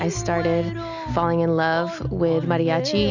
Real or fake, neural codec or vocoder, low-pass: real; none; 7.2 kHz